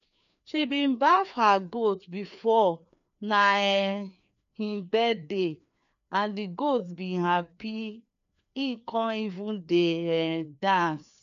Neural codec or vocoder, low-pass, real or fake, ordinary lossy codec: codec, 16 kHz, 2 kbps, FreqCodec, larger model; 7.2 kHz; fake; none